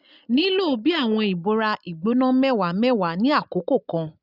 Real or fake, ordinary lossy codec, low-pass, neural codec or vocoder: real; none; 5.4 kHz; none